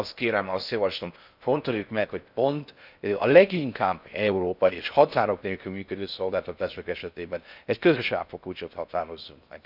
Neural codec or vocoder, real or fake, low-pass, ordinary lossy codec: codec, 16 kHz in and 24 kHz out, 0.6 kbps, FocalCodec, streaming, 4096 codes; fake; 5.4 kHz; none